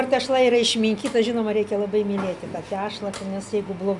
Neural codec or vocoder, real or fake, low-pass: none; real; 10.8 kHz